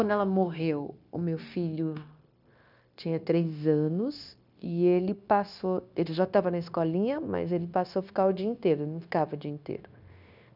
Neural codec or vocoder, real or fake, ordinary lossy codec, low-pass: codec, 16 kHz, 0.9 kbps, LongCat-Audio-Codec; fake; none; 5.4 kHz